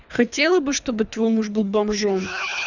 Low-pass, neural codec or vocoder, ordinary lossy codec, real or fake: 7.2 kHz; codec, 24 kHz, 3 kbps, HILCodec; none; fake